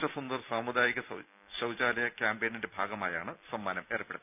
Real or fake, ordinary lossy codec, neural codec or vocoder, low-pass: real; none; none; 3.6 kHz